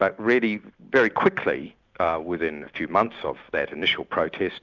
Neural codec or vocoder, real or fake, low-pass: none; real; 7.2 kHz